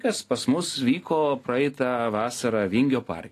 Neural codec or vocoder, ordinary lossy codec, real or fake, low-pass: none; AAC, 48 kbps; real; 14.4 kHz